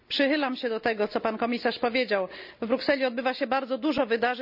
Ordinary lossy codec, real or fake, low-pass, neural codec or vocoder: none; real; 5.4 kHz; none